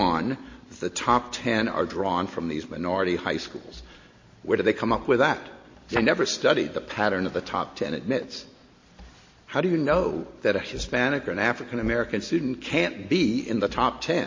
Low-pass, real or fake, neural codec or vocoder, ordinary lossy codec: 7.2 kHz; real; none; MP3, 32 kbps